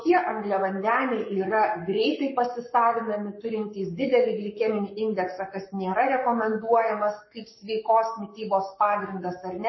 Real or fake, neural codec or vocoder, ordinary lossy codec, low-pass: fake; codec, 44.1 kHz, 7.8 kbps, Pupu-Codec; MP3, 24 kbps; 7.2 kHz